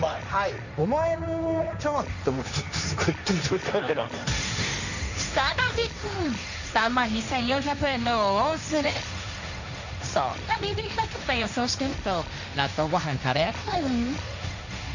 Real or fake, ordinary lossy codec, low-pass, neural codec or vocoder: fake; none; 7.2 kHz; codec, 16 kHz, 1.1 kbps, Voila-Tokenizer